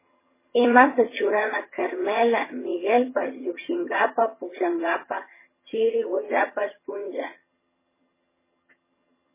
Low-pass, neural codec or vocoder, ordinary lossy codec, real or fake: 3.6 kHz; vocoder, 22.05 kHz, 80 mel bands, HiFi-GAN; MP3, 16 kbps; fake